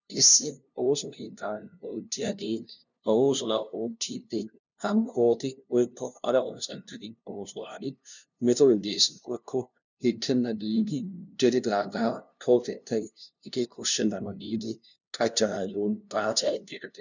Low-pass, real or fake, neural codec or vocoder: 7.2 kHz; fake; codec, 16 kHz, 0.5 kbps, FunCodec, trained on LibriTTS, 25 frames a second